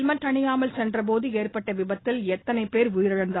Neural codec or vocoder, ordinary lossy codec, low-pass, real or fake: none; AAC, 16 kbps; 7.2 kHz; real